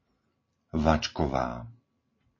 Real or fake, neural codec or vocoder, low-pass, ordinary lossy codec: real; none; 7.2 kHz; MP3, 32 kbps